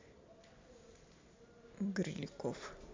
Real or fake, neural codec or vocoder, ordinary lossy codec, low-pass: real; none; MP3, 48 kbps; 7.2 kHz